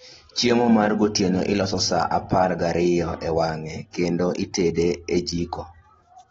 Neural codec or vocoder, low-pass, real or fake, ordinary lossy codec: none; 19.8 kHz; real; AAC, 24 kbps